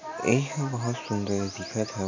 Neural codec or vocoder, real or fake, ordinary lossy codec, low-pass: none; real; AAC, 48 kbps; 7.2 kHz